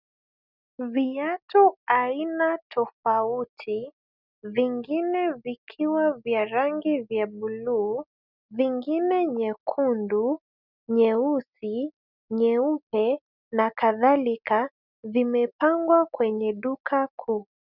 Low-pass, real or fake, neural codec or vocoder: 5.4 kHz; real; none